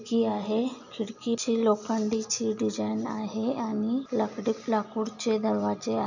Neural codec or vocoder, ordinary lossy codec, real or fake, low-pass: none; none; real; 7.2 kHz